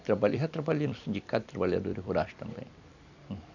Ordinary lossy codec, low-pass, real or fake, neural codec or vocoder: none; 7.2 kHz; fake; vocoder, 44.1 kHz, 128 mel bands every 256 samples, BigVGAN v2